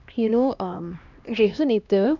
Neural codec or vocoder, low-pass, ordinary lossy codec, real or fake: codec, 16 kHz, 1 kbps, X-Codec, HuBERT features, trained on LibriSpeech; 7.2 kHz; none; fake